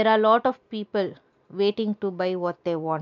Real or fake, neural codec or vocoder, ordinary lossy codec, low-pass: real; none; MP3, 64 kbps; 7.2 kHz